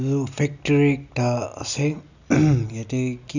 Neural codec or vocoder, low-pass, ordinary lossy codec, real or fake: none; 7.2 kHz; none; real